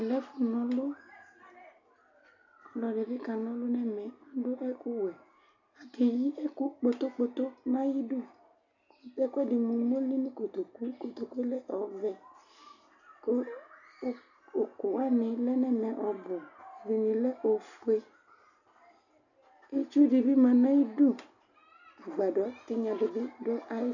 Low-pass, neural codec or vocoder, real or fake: 7.2 kHz; none; real